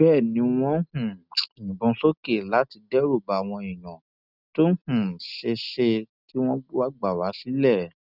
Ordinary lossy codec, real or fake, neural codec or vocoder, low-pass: none; real; none; 5.4 kHz